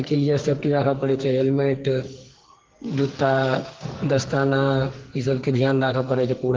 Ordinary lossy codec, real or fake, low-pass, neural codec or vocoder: Opus, 16 kbps; fake; 7.2 kHz; codec, 44.1 kHz, 2.6 kbps, SNAC